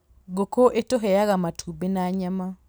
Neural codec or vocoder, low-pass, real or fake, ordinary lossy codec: none; none; real; none